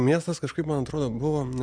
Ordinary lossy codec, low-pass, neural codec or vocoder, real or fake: Opus, 64 kbps; 9.9 kHz; none; real